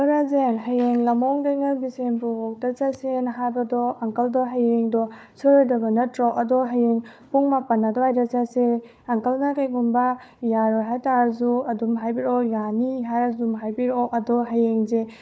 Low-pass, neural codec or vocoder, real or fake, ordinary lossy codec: none; codec, 16 kHz, 4 kbps, FunCodec, trained on Chinese and English, 50 frames a second; fake; none